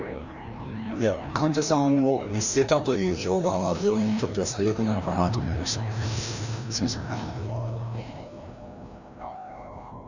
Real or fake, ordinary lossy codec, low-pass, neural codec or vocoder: fake; none; 7.2 kHz; codec, 16 kHz, 1 kbps, FreqCodec, larger model